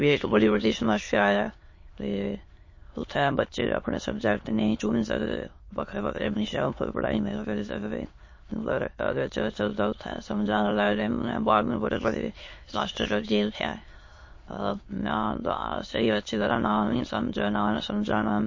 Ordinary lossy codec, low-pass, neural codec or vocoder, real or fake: MP3, 32 kbps; 7.2 kHz; autoencoder, 22.05 kHz, a latent of 192 numbers a frame, VITS, trained on many speakers; fake